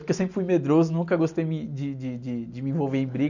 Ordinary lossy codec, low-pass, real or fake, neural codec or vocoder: none; 7.2 kHz; real; none